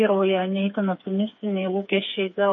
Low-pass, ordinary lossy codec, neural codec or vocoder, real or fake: 7.2 kHz; MP3, 32 kbps; codec, 16 kHz, 4 kbps, FreqCodec, smaller model; fake